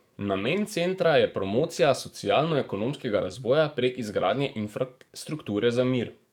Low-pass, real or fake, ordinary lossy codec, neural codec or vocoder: 19.8 kHz; fake; none; codec, 44.1 kHz, 7.8 kbps, DAC